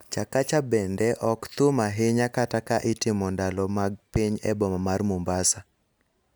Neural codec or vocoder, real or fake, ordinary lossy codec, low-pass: none; real; none; none